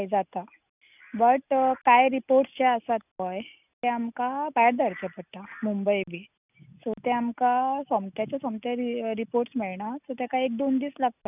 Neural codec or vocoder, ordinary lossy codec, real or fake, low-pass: none; none; real; 3.6 kHz